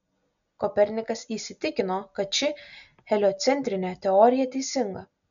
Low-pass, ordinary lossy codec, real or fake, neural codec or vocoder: 7.2 kHz; MP3, 96 kbps; real; none